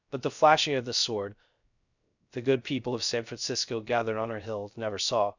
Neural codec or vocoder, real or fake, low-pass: codec, 16 kHz, 0.3 kbps, FocalCodec; fake; 7.2 kHz